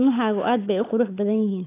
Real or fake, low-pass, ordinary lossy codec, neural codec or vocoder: fake; 3.6 kHz; AAC, 24 kbps; codec, 16 kHz, 8 kbps, FunCodec, trained on Chinese and English, 25 frames a second